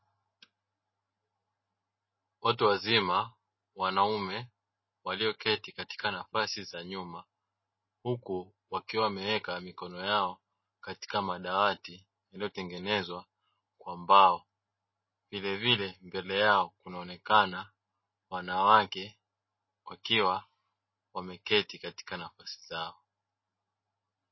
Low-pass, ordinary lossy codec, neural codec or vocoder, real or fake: 7.2 kHz; MP3, 24 kbps; none; real